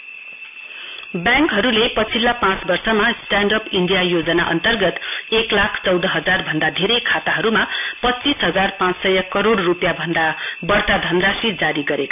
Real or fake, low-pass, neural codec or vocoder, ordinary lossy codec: real; 3.6 kHz; none; none